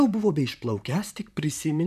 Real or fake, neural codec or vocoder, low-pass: fake; vocoder, 44.1 kHz, 128 mel bands, Pupu-Vocoder; 14.4 kHz